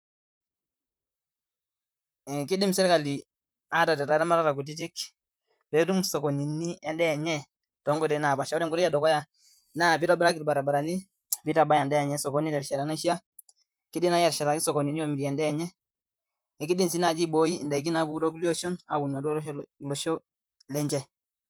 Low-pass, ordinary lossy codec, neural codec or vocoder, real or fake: none; none; vocoder, 44.1 kHz, 128 mel bands, Pupu-Vocoder; fake